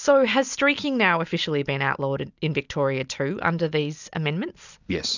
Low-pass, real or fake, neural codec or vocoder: 7.2 kHz; real; none